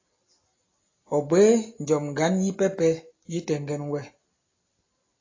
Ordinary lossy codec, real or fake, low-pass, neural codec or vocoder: AAC, 32 kbps; real; 7.2 kHz; none